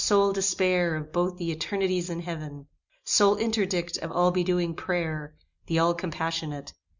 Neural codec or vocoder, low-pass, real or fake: none; 7.2 kHz; real